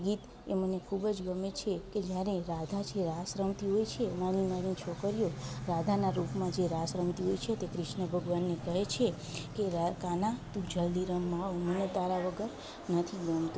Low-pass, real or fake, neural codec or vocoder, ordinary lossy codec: none; real; none; none